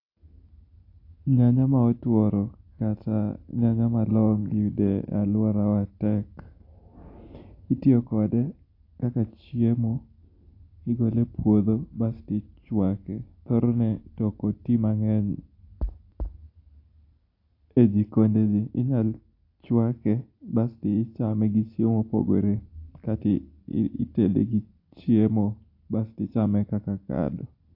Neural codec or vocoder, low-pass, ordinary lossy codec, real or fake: none; 5.4 kHz; AAC, 32 kbps; real